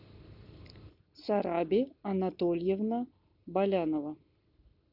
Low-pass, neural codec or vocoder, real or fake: 5.4 kHz; none; real